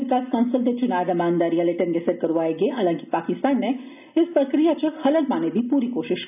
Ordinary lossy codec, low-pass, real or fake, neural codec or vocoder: none; 3.6 kHz; real; none